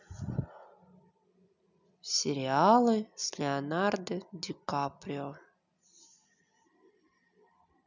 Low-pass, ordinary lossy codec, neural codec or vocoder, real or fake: 7.2 kHz; none; none; real